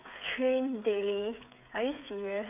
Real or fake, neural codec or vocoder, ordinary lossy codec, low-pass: fake; codec, 16 kHz, 8 kbps, FreqCodec, smaller model; none; 3.6 kHz